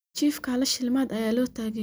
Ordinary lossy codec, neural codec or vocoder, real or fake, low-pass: none; none; real; none